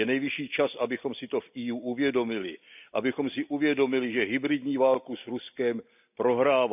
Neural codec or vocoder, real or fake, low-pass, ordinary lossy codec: none; real; 3.6 kHz; none